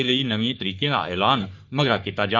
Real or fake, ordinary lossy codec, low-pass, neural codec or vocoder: fake; none; 7.2 kHz; codec, 44.1 kHz, 3.4 kbps, Pupu-Codec